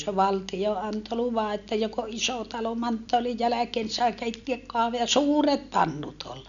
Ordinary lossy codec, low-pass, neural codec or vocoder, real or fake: none; 7.2 kHz; none; real